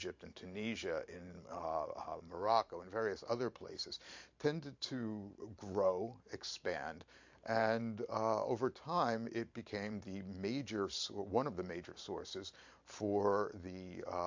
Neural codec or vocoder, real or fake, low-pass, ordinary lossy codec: vocoder, 44.1 kHz, 128 mel bands every 512 samples, BigVGAN v2; fake; 7.2 kHz; MP3, 48 kbps